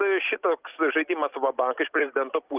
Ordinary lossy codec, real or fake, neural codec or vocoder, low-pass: Opus, 32 kbps; real; none; 3.6 kHz